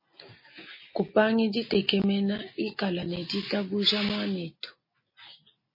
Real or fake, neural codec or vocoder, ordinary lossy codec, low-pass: real; none; MP3, 24 kbps; 5.4 kHz